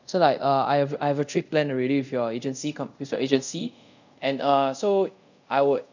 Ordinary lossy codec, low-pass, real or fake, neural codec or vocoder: none; 7.2 kHz; fake; codec, 24 kHz, 0.5 kbps, DualCodec